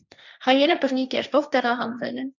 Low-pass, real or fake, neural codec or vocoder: 7.2 kHz; fake; codec, 16 kHz, 1.1 kbps, Voila-Tokenizer